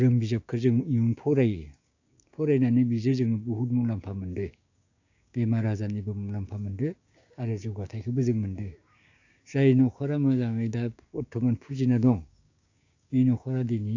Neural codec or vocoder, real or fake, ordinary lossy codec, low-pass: codec, 16 kHz, 6 kbps, DAC; fake; AAC, 48 kbps; 7.2 kHz